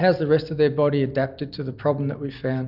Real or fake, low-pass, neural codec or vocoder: real; 5.4 kHz; none